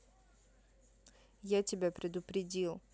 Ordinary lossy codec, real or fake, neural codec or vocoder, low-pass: none; real; none; none